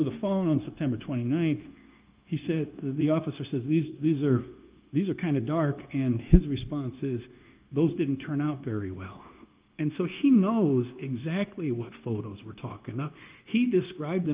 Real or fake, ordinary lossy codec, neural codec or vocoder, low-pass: fake; Opus, 32 kbps; codec, 16 kHz, 0.9 kbps, LongCat-Audio-Codec; 3.6 kHz